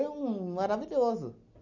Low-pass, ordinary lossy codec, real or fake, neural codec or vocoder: 7.2 kHz; none; real; none